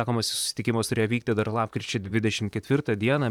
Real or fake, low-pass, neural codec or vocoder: fake; 19.8 kHz; vocoder, 44.1 kHz, 128 mel bands, Pupu-Vocoder